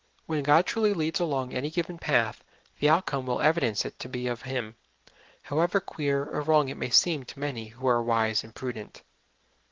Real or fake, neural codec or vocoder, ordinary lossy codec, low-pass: real; none; Opus, 16 kbps; 7.2 kHz